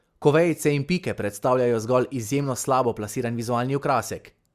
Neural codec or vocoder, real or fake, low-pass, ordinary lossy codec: none; real; 14.4 kHz; Opus, 64 kbps